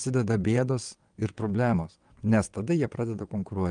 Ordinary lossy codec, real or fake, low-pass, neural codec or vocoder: Opus, 32 kbps; fake; 9.9 kHz; vocoder, 22.05 kHz, 80 mel bands, WaveNeXt